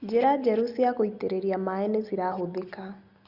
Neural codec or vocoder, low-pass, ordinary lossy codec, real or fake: none; 5.4 kHz; Opus, 64 kbps; real